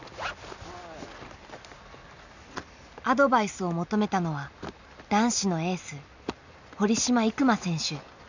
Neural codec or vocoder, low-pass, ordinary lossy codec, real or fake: none; 7.2 kHz; none; real